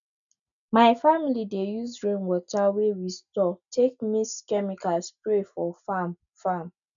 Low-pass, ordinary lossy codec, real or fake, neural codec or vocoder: 7.2 kHz; none; real; none